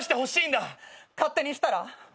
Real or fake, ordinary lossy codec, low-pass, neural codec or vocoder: real; none; none; none